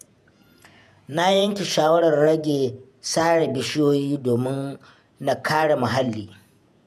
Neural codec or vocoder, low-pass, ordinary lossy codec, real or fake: vocoder, 48 kHz, 128 mel bands, Vocos; 14.4 kHz; none; fake